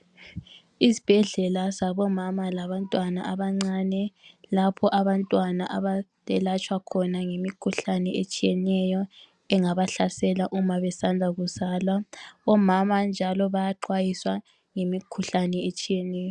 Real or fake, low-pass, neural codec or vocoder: real; 10.8 kHz; none